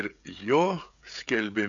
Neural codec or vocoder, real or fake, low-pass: codec, 16 kHz, 16 kbps, FreqCodec, smaller model; fake; 7.2 kHz